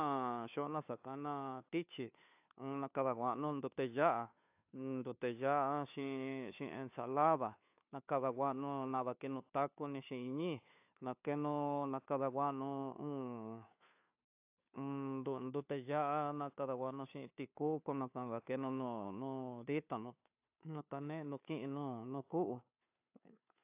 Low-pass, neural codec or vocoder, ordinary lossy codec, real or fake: 3.6 kHz; codec, 16 kHz, 2 kbps, FunCodec, trained on Chinese and English, 25 frames a second; AAC, 32 kbps; fake